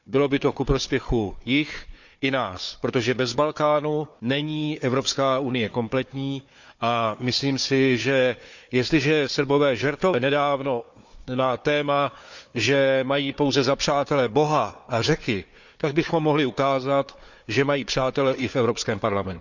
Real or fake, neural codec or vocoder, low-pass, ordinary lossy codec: fake; codec, 16 kHz, 4 kbps, FunCodec, trained on Chinese and English, 50 frames a second; 7.2 kHz; none